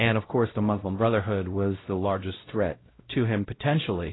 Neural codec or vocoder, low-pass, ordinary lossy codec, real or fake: codec, 16 kHz, 0.5 kbps, X-Codec, WavLM features, trained on Multilingual LibriSpeech; 7.2 kHz; AAC, 16 kbps; fake